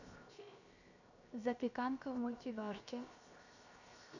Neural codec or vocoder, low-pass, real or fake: codec, 16 kHz, 0.7 kbps, FocalCodec; 7.2 kHz; fake